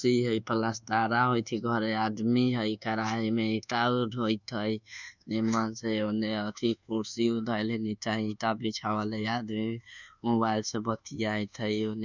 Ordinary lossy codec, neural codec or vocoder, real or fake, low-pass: none; codec, 24 kHz, 1.2 kbps, DualCodec; fake; 7.2 kHz